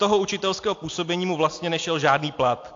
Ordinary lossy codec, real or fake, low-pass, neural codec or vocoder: AAC, 48 kbps; real; 7.2 kHz; none